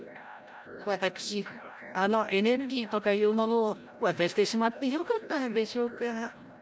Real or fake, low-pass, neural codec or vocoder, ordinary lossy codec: fake; none; codec, 16 kHz, 0.5 kbps, FreqCodec, larger model; none